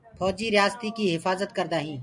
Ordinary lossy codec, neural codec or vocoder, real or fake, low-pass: MP3, 96 kbps; none; real; 10.8 kHz